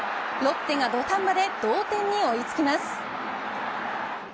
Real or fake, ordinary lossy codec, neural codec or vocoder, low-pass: real; none; none; none